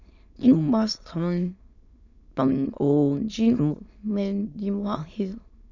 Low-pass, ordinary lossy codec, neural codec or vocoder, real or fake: 7.2 kHz; none; autoencoder, 22.05 kHz, a latent of 192 numbers a frame, VITS, trained on many speakers; fake